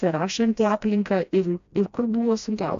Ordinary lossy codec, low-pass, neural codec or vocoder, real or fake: AAC, 64 kbps; 7.2 kHz; codec, 16 kHz, 1 kbps, FreqCodec, smaller model; fake